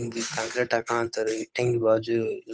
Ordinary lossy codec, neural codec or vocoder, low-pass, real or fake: none; codec, 16 kHz, 8 kbps, FunCodec, trained on Chinese and English, 25 frames a second; none; fake